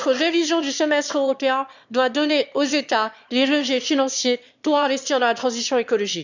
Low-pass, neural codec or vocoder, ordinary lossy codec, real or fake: 7.2 kHz; autoencoder, 22.05 kHz, a latent of 192 numbers a frame, VITS, trained on one speaker; none; fake